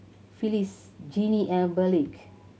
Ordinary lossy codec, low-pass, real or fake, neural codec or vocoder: none; none; real; none